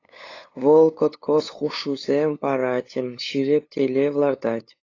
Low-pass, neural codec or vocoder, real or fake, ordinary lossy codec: 7.2 kHz; codec, 16 kHz, 8 kbps, FunCodec, trained on LibriTTS, 25 frames a second; fake; AAC, 32 kbps